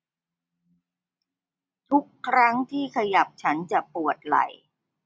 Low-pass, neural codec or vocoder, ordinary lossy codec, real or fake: none; none; none; real